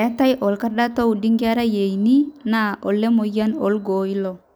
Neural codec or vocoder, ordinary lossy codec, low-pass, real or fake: none; none; none; real